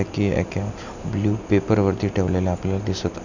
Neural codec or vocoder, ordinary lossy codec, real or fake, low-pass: none; none; real; 7.2 kHz